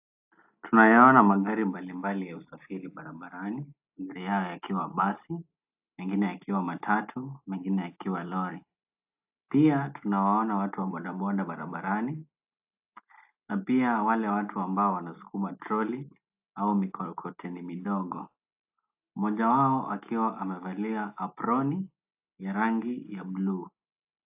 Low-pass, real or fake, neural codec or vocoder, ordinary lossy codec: 3.6 kHz; real; none; AAC, 32 kbps